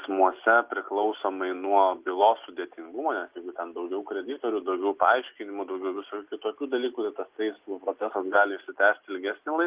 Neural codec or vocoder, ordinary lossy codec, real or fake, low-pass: none; Opus, 32 kbps; real; 3.6 kHz